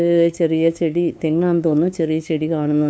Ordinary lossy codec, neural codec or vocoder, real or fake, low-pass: none; codec, 16 kHz, 2 kbps, FunCodec, trained on LibriTTS, 25 frames a second; fake; none